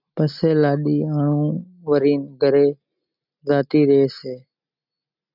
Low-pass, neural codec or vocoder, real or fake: 5.4 kHz; none; real